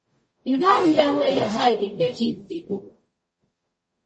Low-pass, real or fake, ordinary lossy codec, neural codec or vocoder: 9.9 kHz; fake; MP3, 32 kbps; codec, 44.1 kHz, 0.9 kbps, DAC